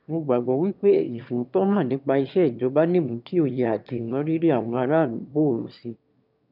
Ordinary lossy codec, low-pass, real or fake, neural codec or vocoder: none; 5.4 kHz; fake; autoencoder, 22.05 kHz, a latent of 192 numbers a frame, VITS, trained on one speaker